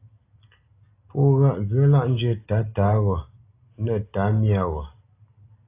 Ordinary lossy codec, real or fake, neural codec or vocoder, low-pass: AAC, 24 kbps; real; none; 3.6 kHz